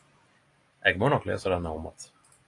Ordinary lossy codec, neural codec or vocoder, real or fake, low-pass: AAC, 48 kbps; vocoder, 44.1 kHz, 128 mel bands every 256 samples, BigVGAN v2; fake; 10.8 kHz